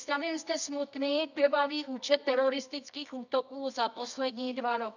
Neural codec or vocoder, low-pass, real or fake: codec, 24 kHz, 0.9 kbps, WavTokenizer, medium music audio release; 7.2 kHz; fake